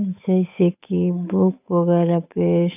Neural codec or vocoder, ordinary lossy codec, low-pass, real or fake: codec, 16 kHz, 8 kbps, FunCodec, trained on Chinese and English, 25 frames a second; none; 3.6 kHz; fake